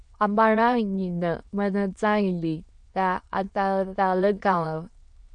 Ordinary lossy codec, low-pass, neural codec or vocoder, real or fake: MP3, 64 kbps; 9.9 kHz; autoencoder, 22.05 kHz, a latent of 192 numbers a frame, VITS, trained on many speakers; fake